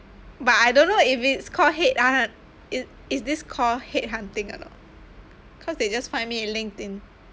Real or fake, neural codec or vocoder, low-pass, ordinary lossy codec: real; none; none; none